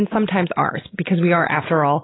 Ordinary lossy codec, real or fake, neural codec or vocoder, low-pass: AAC, 16 kbps; real; none; 7.2 kHz